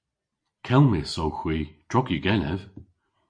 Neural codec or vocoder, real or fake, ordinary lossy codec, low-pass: none; real; MP3, 48 kbps; 9.9 kHz